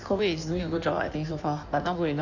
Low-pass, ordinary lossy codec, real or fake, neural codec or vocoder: 7.2 kHz; none; fake; codec, 16 kHz in and 24 kHz out, 1.1 kbps, FireRedTTS-2 codec